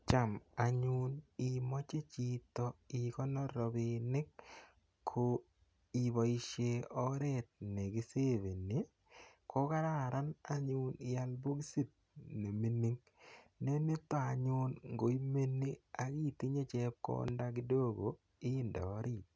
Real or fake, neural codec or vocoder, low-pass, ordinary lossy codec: real; none; none; none